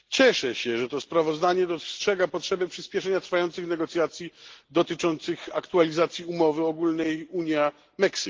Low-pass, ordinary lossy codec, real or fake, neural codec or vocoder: 7.2 kHz; Opus, 16 kbps; real; none